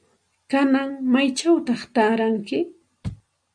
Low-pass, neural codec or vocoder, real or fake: 9.9 kHz; none; real